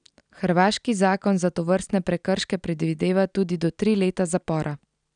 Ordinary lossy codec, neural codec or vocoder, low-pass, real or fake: none; none; 9.9 kHz; real